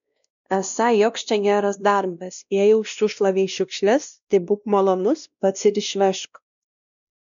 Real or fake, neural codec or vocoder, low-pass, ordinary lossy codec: fake; codec, 16 kHz, 1 kbps, X-Codec, WavLM features, trained on Multilingual LibriSpeech; 7.2 kHz; MP3, 96 kbps